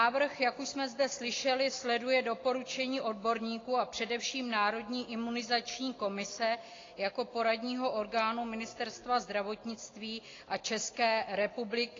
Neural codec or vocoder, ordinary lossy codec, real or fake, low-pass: none; AAC, 32 kbps; real; 7.2 kHz